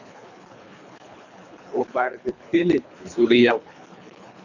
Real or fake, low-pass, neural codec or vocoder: fake; 7.2 kHz; codec, 24 kHz, 3 kbps, HILCodec